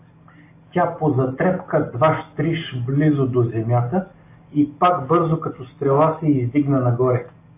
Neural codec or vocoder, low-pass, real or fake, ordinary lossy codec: none; 3.6 kHz; real; AAC, 24 kbps